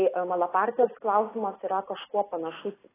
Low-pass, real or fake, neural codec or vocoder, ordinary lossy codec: 3.6 kHz; real; none; AAC, 16 kbps